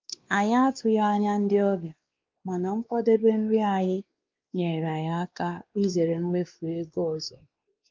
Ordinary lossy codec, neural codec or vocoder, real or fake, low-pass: Opus, 32 kbps; codec, 16 kHz, 2 kbps, X-Codec, WavLM features, trained on Multilingual LibriSpeech; fake; 7.2 kHz